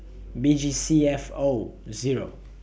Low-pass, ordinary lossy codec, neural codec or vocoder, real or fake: none; none; none; real